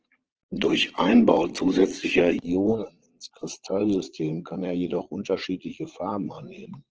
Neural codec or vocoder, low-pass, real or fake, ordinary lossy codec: vocoder, 22.05 kHz, 80 mel bands, Vocos; 7.2 kHz; fake; Opus, 16 kbps